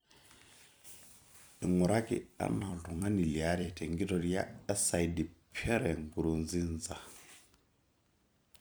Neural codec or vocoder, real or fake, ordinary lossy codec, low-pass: vocoder, 44.1 kHz, 128 mel bands every 256 samples, BigVGAN v2; fake; none; none